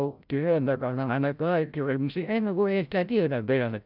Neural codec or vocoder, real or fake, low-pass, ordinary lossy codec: codec, 16 kHz, 0.5 kbps, FreqCodec, larger model; fake; 5.4 kHz; none